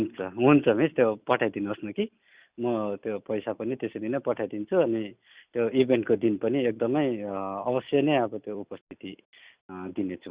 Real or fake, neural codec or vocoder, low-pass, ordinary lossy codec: real; none; 3.6 kHz; Opus, 64 kbps